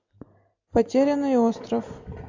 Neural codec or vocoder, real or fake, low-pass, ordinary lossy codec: none; real; 7.2 kHz; AAC, 48 kbps